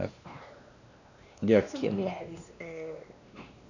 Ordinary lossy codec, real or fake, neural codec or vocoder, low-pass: none; fake; codec, 16 kHz, 2 kbps, X-Codec, WavLM features, trained on Multilingual LibriSpeech; 7.2 kHz